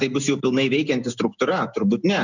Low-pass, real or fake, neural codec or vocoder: 7.2 kHz; real; none